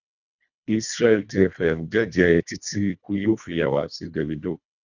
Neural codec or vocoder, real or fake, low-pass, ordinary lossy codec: codec, 24 kHz, 1.5 kbps, HILCodec; fake; 7.2 kHz; none